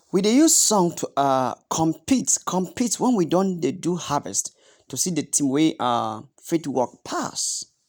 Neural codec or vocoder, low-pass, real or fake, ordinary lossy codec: none; none; real; none